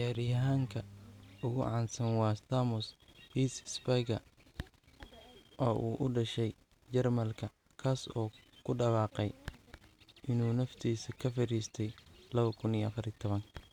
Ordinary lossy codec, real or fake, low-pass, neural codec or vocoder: none; real; 19.8 kHz; none